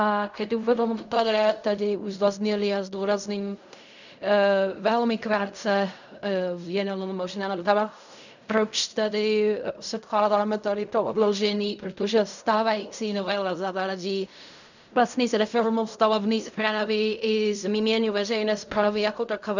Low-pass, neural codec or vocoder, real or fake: 7.2 kHz; codec, 16 kHz in and 24 kHz out, 0.4 kbps, LongCat-Audio-Codec, fine tuned four codebook decoder; fake